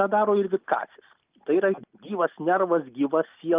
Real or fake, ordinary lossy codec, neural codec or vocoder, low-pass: real; Opus, 24 kbps; none; 3.6 kHz